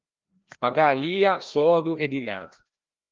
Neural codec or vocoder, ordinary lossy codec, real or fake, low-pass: codec, 16 kHz, 1 kbps, FreqCodec, larger model; Opus, 32 kbps; fake; 7.2 kHz